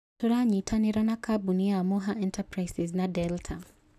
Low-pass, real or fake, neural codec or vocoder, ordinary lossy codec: 14.4 kHz; real; none; none